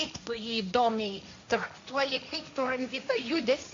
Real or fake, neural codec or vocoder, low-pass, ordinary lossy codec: fake; codec, 16 kHz, 1.1 kbps, Voila-Tokenizer; 7.2 kHz; AAC, 48 kbps